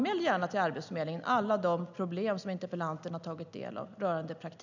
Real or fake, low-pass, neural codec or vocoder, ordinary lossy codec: real; 7.2 kHz; none; none